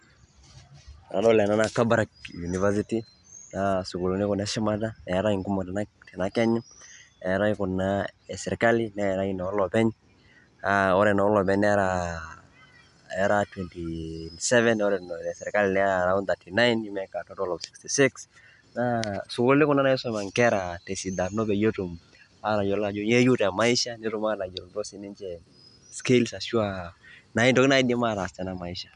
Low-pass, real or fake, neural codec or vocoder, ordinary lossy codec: 10.8 kHz; real; none; none